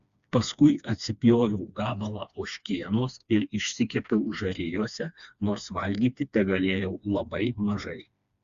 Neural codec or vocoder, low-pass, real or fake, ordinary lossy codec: codec, 16 kHz, 2 kbps, FreqCodec, smaller model; 7.2 kHz; fake; Opus, 64 kbps